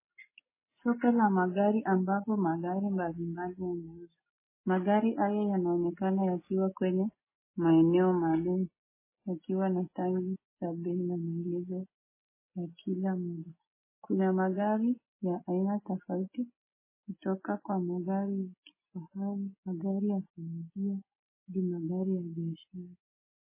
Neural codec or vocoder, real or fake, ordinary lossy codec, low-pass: none; real; MP3, 16 kbps; 3.6 kHz